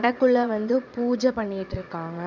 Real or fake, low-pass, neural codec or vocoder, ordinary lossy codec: fake; 7.2 kHz; vocoder, 22.05 kHz, 80 mel bands, Vocos; none